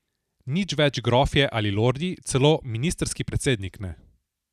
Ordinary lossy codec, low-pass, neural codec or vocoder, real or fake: none; 14.4 kHz; none; real